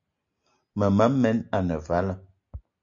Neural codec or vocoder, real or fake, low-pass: none; real; 7.2 kHz